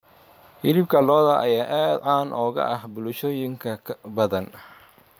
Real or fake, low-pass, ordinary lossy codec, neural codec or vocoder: fake; none; none; vocoder, 44.1 kHz, 128 mel bands every 512 samples, BigVGAN v2